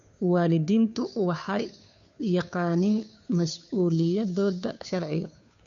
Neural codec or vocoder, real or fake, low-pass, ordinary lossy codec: codec, 16 kHz, 2 kbps, FunCodec, trained on Chinese and English, 25 frames a second; fake; 7.2 kHz; none